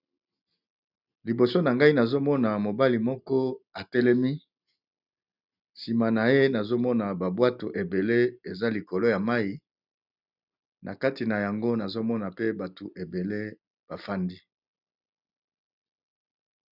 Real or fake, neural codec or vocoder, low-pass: real; none; 5.4 kHz